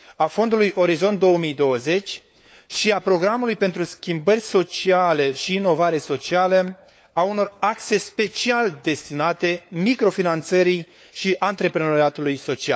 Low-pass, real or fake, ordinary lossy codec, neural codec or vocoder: none; fake; none; codec, 16 kHz, 4 kbps, FunCodec, trained on LibriTTS, 50 frames a second